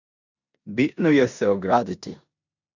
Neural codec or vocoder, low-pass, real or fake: codec, 16 kHz in and 24 kHz out, 0.9 kbps, LongCat-Audio-Codec, four codebook decoder; 7.2 kHz; fake